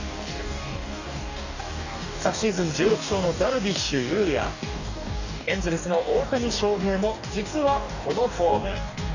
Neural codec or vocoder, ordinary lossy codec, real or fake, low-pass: codec, 44.1 kHz, 2.6 kbps, DAC; none; fake; 7.2 kHz